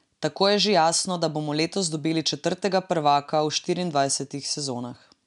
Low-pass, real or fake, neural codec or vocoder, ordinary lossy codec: 10.8 kHz; real; none; none